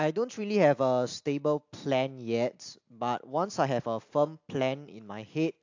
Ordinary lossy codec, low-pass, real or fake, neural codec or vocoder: AAC, 48 kbps; 7.2 kHz; real; none